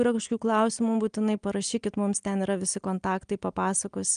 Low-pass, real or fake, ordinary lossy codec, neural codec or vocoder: 9.9 kHz; real; Opus, 32 kbps; none